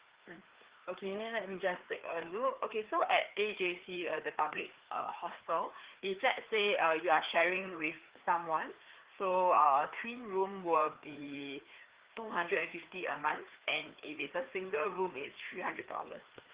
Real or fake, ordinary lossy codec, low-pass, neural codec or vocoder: fake; Opus, 16 kbps; 3.6 kHz; codec, 16 kHz, 2 kbps, FreqCodec, larger model